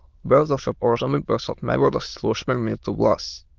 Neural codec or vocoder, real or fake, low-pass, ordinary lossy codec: autoencoder, 22.05 kHz, a latent of 192 numbers a frame, VITS, trained on many speakers; fake; 7.2 kHz; Opus, 32 kbps